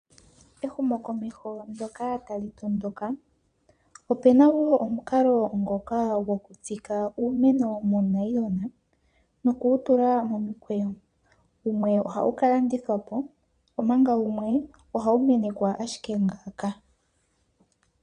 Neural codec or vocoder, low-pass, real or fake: vocoder, 22.05 kHz, 80 mel bands, Vocos; 9.9 kHz; fake